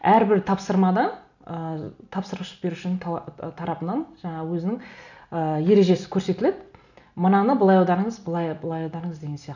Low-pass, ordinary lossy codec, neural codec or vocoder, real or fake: 7.2 kHz; none; none; real